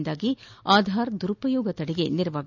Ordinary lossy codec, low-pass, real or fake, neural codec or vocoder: none; 7.2 kHz; real; none